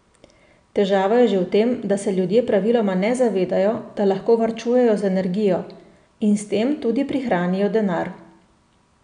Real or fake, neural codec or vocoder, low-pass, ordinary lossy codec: real; none; 9.9 kHz; none